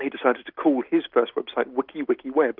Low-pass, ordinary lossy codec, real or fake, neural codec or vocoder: 5.4 kHz; Opus, 24 kbps; real; none